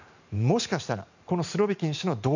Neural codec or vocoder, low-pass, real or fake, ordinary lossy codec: vocoder, 44.1 kHz, 128 mel bands every 512 samples, BigVGAN v2; 7.2 kHz; fake; none